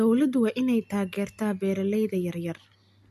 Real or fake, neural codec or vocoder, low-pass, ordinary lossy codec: real; none; 14.4 kHz; AAC, 96 kbps